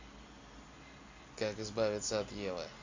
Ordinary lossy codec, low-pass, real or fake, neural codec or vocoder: MP3, 64 kbps; 7.2 kHz; real; none